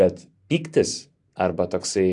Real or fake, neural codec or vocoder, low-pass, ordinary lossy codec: real; none; 10.8 kHz; AAC, 64 kbps